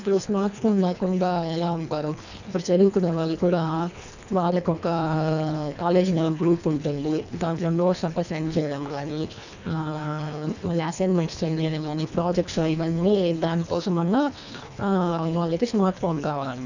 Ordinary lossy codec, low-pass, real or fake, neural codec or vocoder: none; 7.2 kHz; fake; codec, 24 kHz, 1.5 kbps, HILCodec